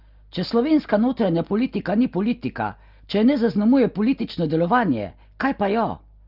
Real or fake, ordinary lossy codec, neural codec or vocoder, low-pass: real; Opus, 32 kbps; none; 5.4 kHz